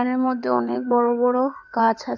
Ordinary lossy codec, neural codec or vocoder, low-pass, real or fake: none; codec, 16 kHz in and 24 kHz out, 2.2 kbps, FireRedTTS-2 codec; 7.2 kHz; fake